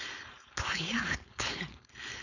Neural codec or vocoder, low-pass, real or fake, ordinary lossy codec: codec, 16 kHz, 4.8 kbps, FACodec; 7.2 kHz; fake; none